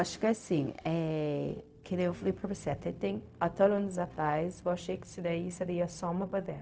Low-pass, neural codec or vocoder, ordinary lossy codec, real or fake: none; codec, 16 kHz, 0.4 kbps, LongCat-Audio-Codec; none; fake